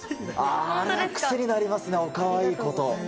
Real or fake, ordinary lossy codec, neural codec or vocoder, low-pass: real; none; none; none